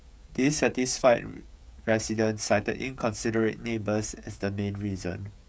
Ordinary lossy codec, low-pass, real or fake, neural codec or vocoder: none; none; fake; codec, 16 kHz, 16 kbps, FreqCodec, smaller model